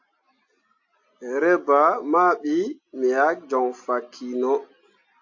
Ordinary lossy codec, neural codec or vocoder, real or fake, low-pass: AAC, 48 kbps; none; real; 7.2 kHz